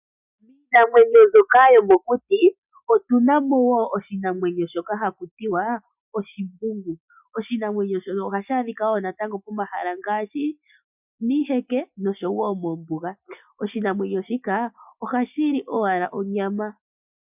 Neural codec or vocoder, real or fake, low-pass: none; real; 3.6 kHz